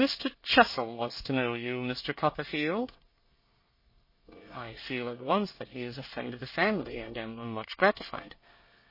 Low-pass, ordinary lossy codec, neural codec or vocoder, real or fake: 5.4 kHz; MP3, 24 kbps; codec, 24 kHz, 1 kbps, SNAC; fake